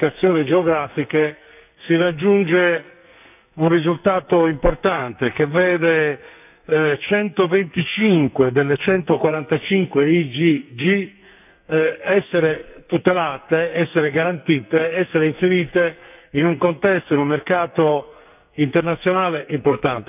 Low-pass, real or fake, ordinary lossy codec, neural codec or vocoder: 3.6 kHz; fake; none; codec, 44.1 kHz, 2.6 kbps, SNAC